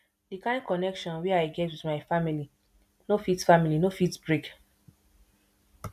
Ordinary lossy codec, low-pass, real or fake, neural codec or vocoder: none; 14.4 kHz; real; none